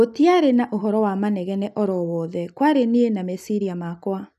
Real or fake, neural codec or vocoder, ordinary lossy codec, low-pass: real; none; none; 14.4 kHz